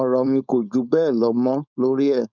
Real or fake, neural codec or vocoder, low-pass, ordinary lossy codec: fake; codec, 16 kHz, 4.8 kbps, FACodec; 7.2 kHz; none